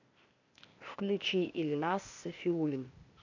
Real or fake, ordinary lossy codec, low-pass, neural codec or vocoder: fake; AAC, 48 kbps; 7.2 kHz; codec, 16 kHz, 0.8 kbps, ZipCodec